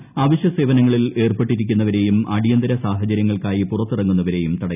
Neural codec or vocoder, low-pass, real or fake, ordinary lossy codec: none; 3.6 kHz; real; none